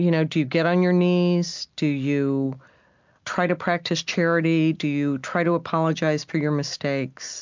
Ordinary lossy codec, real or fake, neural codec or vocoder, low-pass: MP3, 64 kbps; real; none; 7.2 kHz